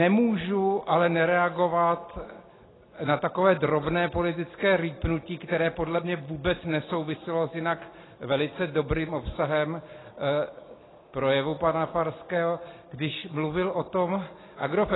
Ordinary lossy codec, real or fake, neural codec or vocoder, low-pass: AAC, 16 kbps; real; none; 7.2 kHz